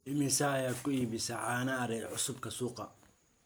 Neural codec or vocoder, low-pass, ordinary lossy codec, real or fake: none; none; none; real